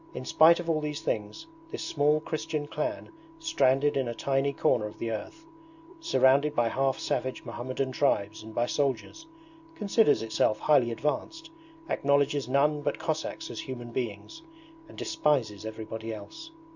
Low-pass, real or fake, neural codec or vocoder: 7.2 kHz; real; none